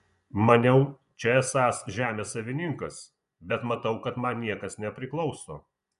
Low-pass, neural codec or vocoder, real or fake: 10.8 kHz; vocoder, 24 kHz, 100 mel bands, Vocos; fake